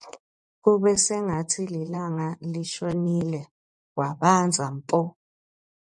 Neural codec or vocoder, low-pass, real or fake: vocoder, 24 kHz, 100 mel bands, Vocos; 10.8 kHz; fake